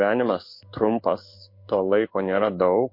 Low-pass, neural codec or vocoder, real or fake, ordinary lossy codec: 5.4 kHz; codec, 16 kHz in and 24 kHz out, 1 kbps, XY-Tokenizer; fake; MP3, 32 kbps